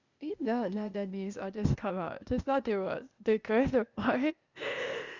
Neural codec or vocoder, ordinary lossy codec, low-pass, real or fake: codec, 16 kHz, 0.8 kbps, ZipCodec; Opus, 64 kbps; 7.2 kHz; fake